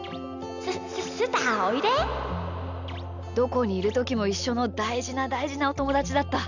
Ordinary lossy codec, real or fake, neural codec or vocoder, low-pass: none; real; none; 7.2 kHz